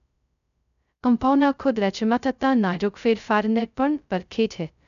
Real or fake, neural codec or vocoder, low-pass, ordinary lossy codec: fake; codec, 16 kHz, 0.2 kbps, FocalCodec; 7.2 kHz; none